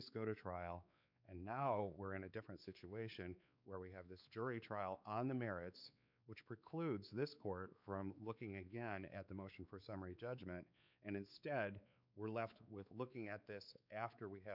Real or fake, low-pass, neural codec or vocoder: fake; 5.4 kHz; codec, 16 kHz, 4 kbps, X-Codec, WavLM features, trained on Multilingual LibriSpeech